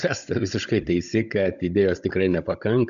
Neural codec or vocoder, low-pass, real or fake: codec, 16 kHz, 16 kbps, FunCodec, trained on Chinese and English, 50 frames a second; 7.2 kHz; fake